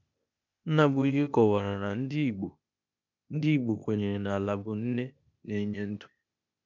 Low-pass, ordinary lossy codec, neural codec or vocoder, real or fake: 7.2 kHz; none; codec, 16 kHz, 0.8 kbps, ZipCodec; fake